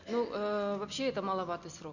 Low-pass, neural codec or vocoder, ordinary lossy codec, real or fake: 7.2 kHz; none; none; real